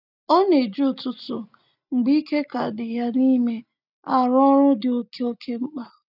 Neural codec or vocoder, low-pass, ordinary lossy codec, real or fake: none; 5.4 kHz; none; real